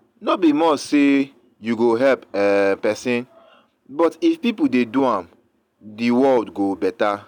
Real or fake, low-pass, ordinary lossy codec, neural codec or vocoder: real; 19.8 kHz; none; none